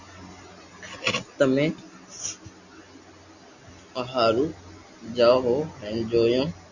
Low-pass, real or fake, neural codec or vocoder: 7.2 kHz; real; none